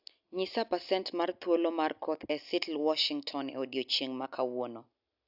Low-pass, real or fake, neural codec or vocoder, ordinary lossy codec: 5.4 kHz; real; none; AAC, 48 kbps